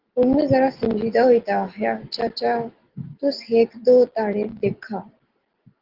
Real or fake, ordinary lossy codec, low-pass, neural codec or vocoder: real; Opus, 16 kbps; 5.4 kHz; none